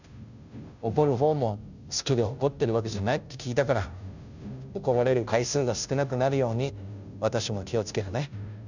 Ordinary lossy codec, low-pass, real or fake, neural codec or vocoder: none; 7.2 kHz; fake; codec, 16 kHz, 0.5 kbps, FunCodec, trained on Chinese and English, 25 frames a second